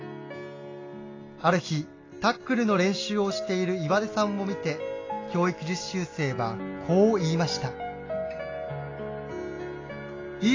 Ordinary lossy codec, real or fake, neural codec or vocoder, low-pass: AAC, 32 kbps; real; none; 7.2 kHz